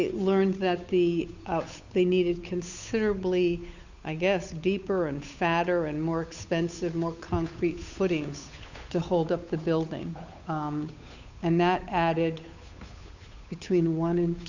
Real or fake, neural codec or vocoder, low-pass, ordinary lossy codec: fake; codec, 16 kHz, 8 kbps, FunCodec, trained on Chinese and English, 25 frames a second; 7.2 kHz; Opus, 64 kbps